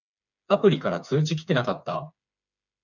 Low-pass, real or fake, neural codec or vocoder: 7.2 kHz; fake; codec, 16 kHz, 4 kbps, FreqCodec, smaller model